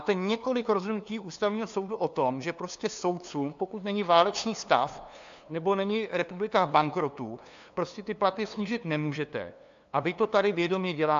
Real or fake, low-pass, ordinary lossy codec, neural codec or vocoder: fake; 7.2 kHz; AAC, 64 kbps; codec, 16 kHz, 2 kbps, FunCodec, trained on LibriTTS, 25 frames a second